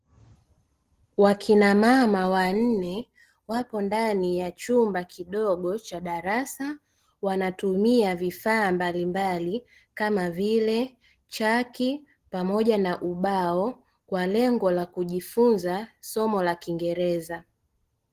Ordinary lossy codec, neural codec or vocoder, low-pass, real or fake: Opus, 16 kbps; none; 14.4 kHz; real